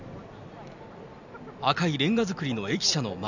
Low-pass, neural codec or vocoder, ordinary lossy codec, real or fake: 7.2 kHz; none; none; real